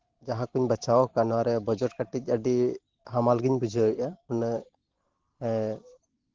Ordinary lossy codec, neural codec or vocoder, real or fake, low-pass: Opus, 16 kbps; vocoder, 44.1 kHz, 128 mel bands every 512 samples, BigVGAN v2; fake; 7.2 kHz